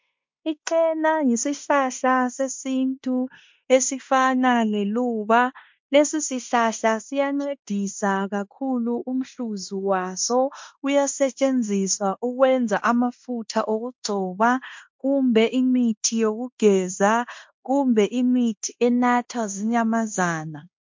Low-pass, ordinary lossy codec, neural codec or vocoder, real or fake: 7.2 kHz; MP3, 48 kbps; codec, 16 kHz in and 24 kHz out, 0.9 kbps, LongCat-Audio-Codec, fine tuned four codebook decoder; fake